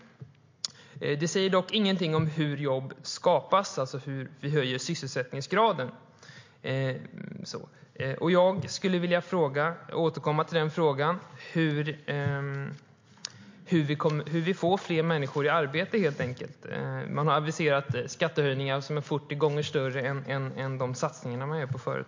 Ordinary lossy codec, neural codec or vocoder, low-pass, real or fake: MP3, 48 kbps; none; 7.2 kHz; real